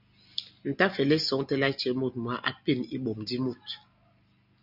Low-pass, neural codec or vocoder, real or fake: 5.4 kHz; none; real